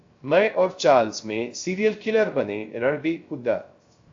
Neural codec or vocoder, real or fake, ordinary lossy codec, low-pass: codec, 16 kHz, 0.3 kbps, FocalCodec; fake; AAC, 48 kbps; 7.2 kHz